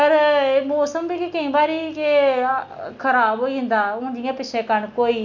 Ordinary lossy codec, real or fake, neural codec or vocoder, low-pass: none; real; none; 7.2 kHz